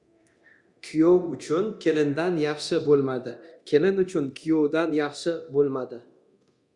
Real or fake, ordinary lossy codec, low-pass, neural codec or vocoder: fake; Opus, 64 kbps; 10.8 kHz; codec, 24 kHz, 0.9 kbps, DualCodec